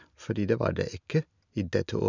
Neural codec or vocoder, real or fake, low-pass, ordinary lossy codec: none; real; 7.2 kHz; none